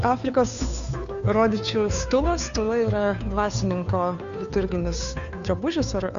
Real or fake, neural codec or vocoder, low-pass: fake; codec, 16 kHz, 2 kbps, FunCodec, trained on Chinese and English, 25 frames a second; 7.2 kHz